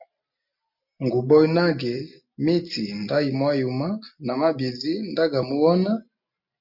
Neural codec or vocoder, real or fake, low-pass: none; real; 5.4 kHz